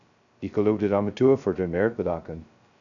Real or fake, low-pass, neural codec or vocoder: fake; 7.2 kHz; codec, 16 kHz, 0.2 kbps, FocalCodec